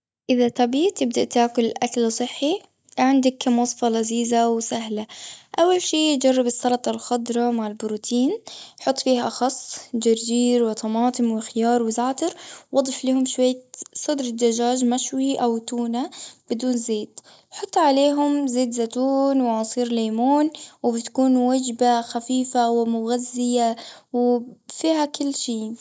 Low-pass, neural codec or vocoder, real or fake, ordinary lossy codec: none; none; real; none